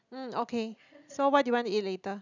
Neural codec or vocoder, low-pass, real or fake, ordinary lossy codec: none; 7.2 kHz; real; none